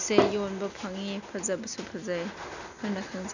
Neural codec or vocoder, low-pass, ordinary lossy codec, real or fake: none; 7.2 kHz; none; real